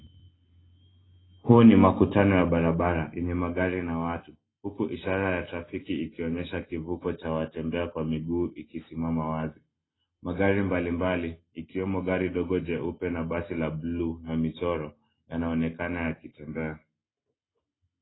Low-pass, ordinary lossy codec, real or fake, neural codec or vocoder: 7.2 kHz; AAC, 16 kbps; real; none